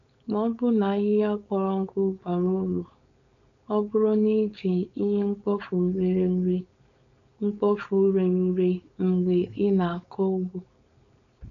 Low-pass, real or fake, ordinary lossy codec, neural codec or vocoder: 7.2 kHz; fake; none; codec, 16 kHz, 4.8 kbps, FACodec